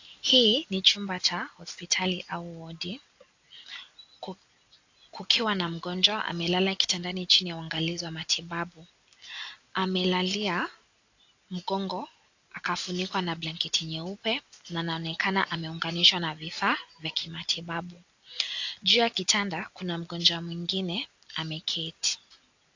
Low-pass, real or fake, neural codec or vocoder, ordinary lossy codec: 7.2 kHz; real; none; AAC, 48 kbps